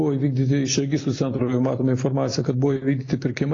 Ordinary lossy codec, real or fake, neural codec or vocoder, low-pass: AAC, 32 kbps; real; none; 7.2 kHz